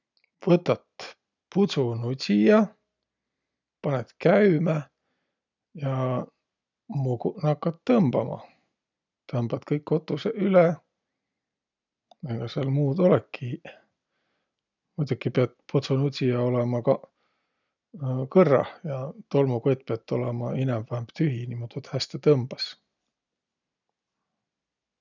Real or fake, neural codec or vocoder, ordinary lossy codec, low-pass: real; none; none; 7.2 kHz